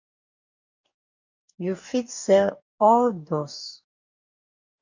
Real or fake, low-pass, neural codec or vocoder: fake; 7.2 kHz; codec, 44.1 kHz, 2.6 kbps, DAC